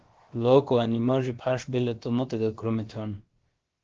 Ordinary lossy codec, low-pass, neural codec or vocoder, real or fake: Opus, 16 kbps; 7.2 kHz; codec, 16 kHz, about 1 kbps, DyCAST, with the encoder's durations; fake